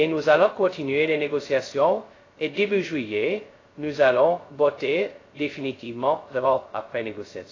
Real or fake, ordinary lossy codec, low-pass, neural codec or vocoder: fake; AAC, 32 kbps; 7.2 kHz; codec, 16 kHz, 0.2 kbps, FocalCodec